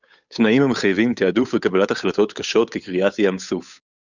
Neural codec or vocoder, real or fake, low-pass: codec, 16 kHz, 8 kbps, FunCodec, trained on Chinese and English, 25 frames a second; fake; 7.2 kHz